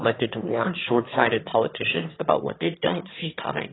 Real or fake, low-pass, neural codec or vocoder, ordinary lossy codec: fake; 7.2 kHz; autoencoder, 22.05 kHz, a latent of 192 numbers a frame, VITS, trained on one speaker; AAC, 16 kbps